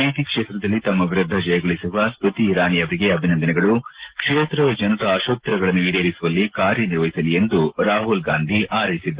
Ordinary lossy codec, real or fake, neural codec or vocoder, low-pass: Opus, 16 kbps; real; none; 3.6 kHz